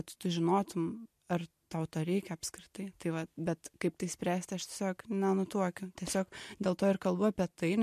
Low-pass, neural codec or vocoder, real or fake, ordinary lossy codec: 14.4 kHz; none; real; MP3, 64 kbps